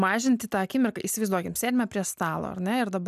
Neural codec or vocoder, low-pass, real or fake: none; 14.4 kHz; real